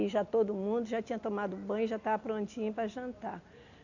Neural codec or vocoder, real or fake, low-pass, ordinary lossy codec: none; real; 7.2 kHz; none